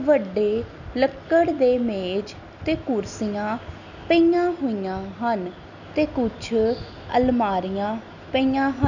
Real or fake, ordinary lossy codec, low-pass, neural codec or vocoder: real; none; 7.2 kHz; none